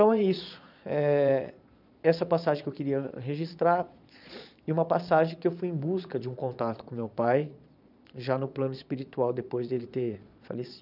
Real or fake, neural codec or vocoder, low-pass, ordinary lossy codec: fake; vocoder, 22.05 kHz, 80 mel bands, Vocos; 5.4 kHz; none